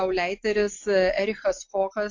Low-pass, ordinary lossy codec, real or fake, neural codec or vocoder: 7.2 kHz; AAC, 48 kbps; real; none